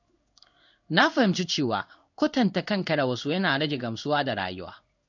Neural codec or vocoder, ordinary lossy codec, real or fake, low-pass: codec, 16 kHz in and 24 kHz out, 1 kbps, XY-Tokenizer; none; fake; 7.2 kHz